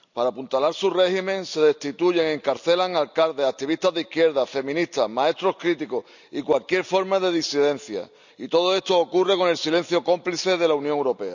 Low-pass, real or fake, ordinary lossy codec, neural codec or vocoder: 7.2 kHz; real; none; none